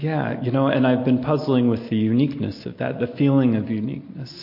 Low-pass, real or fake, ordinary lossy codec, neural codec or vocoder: 5.4 kHz; real; MP3, 32 kbps; none